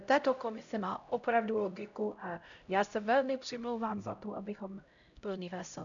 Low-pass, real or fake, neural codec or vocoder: 7.2 kHz; fake; codec, 16 kHz, 0.5 kbps, X-Codec, HuBERT features, trained on LibriSpeech